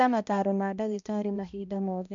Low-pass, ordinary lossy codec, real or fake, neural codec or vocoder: 7.2 kHz; MP3, 64 kbps; fake; codec, 16 kHz, 1 kbps, X-Codec, HuBERT features, trained on balanced general audio